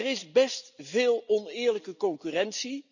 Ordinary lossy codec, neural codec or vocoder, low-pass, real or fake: none; none; 7.2 kHz; real